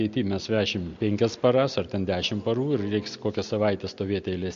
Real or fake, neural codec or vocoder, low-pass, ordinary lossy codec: real; none; 7.2 kHz; MP3, 48 kbps